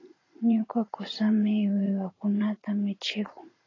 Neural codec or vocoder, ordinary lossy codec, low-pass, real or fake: vocoder, 44.1 kHz, 80 mel bands, Vocos; AAC, 32 kbps; 7.2 kHz; fake